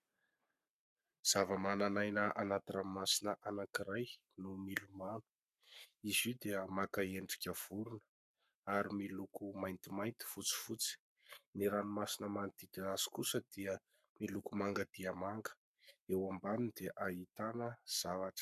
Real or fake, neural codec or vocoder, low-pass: fake; codec, 44.1 kHz, 7.8 kbps, Pupu-Codec; 14.4 kHz